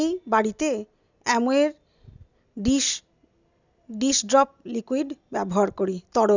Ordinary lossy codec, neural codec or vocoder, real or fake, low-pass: none; none; real; 7.2 kHz